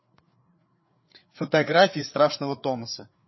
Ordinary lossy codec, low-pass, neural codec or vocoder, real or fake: MP3, 24 kbps; 7.2 kHz; codec, 16 kHz, 4 kbps, FreqCodec, larger model; fake